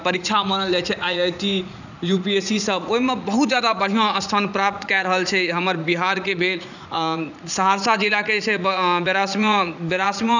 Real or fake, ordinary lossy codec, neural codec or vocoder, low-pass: fake; none; vocoder, 44.1 kHz, 80 mel bands, Vocos; 7.2 kHz